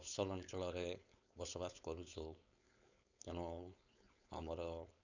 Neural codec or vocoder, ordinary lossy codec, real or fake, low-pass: codec, 16 kHz, 4.8 kbps, FACodec; none; fake; 7.2 kHz